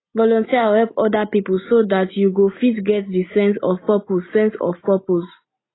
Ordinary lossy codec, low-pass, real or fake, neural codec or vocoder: AAC, 16 kbps; 7.2 kHz; real; none